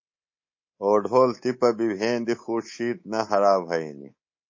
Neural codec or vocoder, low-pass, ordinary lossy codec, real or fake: codec, 24 kHz, 3.1 kbps, DualCodec; 7.2 kHz; MP3, 32 kbps; fake